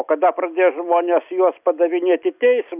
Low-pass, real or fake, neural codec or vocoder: 3.6 kHz; real; none